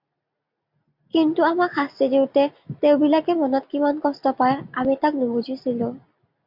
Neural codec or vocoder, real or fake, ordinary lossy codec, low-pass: none; real; MP3, 48 kbps; 5.4 kHz